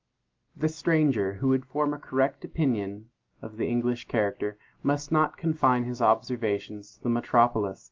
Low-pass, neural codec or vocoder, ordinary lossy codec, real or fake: 7.2 kHz; none; Opus, 32 kbps; real